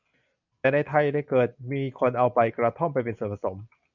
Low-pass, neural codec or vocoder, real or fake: 7.2 kHz; none; real